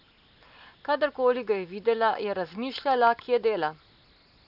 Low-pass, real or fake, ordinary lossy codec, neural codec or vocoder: 5.4 kHz; real; none; none